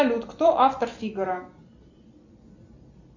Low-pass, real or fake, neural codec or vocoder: 7.2 kHz; real; none